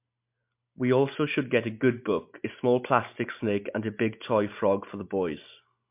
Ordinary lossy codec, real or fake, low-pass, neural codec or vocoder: MP3, 32 kbps; real; 3.6 kHz; none